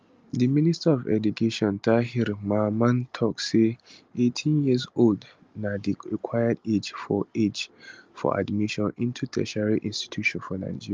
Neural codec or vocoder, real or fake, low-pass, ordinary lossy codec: none; real; 7.2 kHz; Opus, 24 kbps